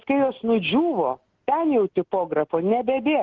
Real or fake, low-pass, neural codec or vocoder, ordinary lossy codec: real; 7.2 kHz; none; Opus, 16 kbps